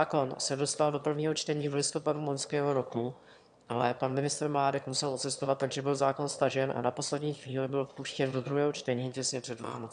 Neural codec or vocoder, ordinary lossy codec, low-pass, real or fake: autoencoder, 22.05 kHz, a latent of 192 numbers a frame, VITS, trained on one speaker; AAC, 96 kbps; 9.9 kHz; fake